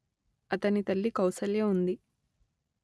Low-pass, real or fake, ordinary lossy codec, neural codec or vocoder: none; real; none; none